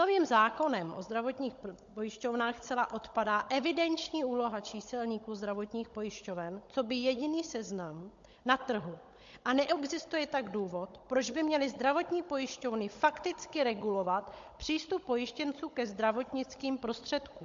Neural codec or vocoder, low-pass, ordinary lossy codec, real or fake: codec, 16 kHz, 16 kbps, FunCodec, trained on Chinese and English, 50 frames a second; 7.2 kHz; MP3, 48 kbps; fake